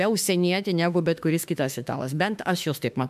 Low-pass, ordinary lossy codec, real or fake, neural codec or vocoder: 14.4 kHz; MP3, 96 kbps; fake; autoencoder, 48 kHz, 32 numbers a frame, DAC-VAE, trained on Japanese speech